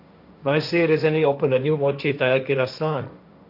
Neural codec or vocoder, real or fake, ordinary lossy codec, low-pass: codec, 16 kHz, 1.1 kbps, Voila-Tokenizer; fake; none; 5.4 kHz